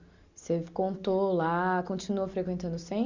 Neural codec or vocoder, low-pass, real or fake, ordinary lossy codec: none; 7.2 kHz; real; Opus, 64 kbps